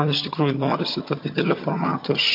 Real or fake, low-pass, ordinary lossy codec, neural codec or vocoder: fake; 5.4 kHz; MP3, 32 kbps; vocoder, 22.05 kHz, 80 mel bands, HiFi-GAN